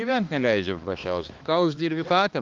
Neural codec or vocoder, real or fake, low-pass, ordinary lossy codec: codec, 16 kHz, 2 kbps, X-Codec, HuBERT features, trained on balanced general audio; fake; 7.2 kHz; Opus, 32 kbps